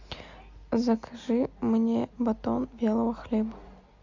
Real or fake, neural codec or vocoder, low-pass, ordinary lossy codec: real; none; 7.2 kHz; MP3, 64 kbps